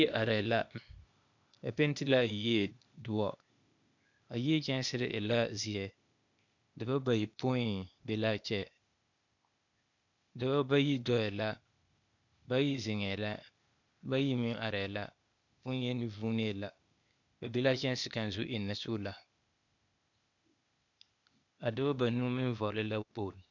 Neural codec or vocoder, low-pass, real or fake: codec, 16 kHz, 0.8 kbps, ZipCodec; 7.2 kHz; fake